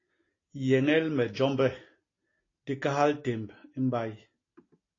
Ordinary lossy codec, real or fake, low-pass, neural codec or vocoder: AAC, 32 kbps; real; 7.2 kHz; none